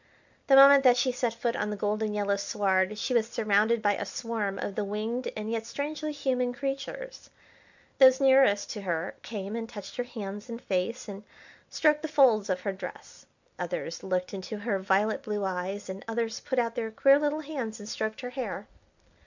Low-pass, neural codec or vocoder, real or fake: 7.2 kHz; none; real